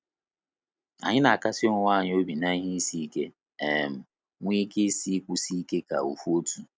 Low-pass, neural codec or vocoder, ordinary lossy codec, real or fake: none; none; none; real